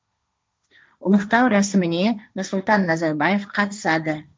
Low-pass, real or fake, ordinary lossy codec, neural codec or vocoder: none; fake; none; codec, 16 kHz, 1.1 kbps, Voila-Tokenizer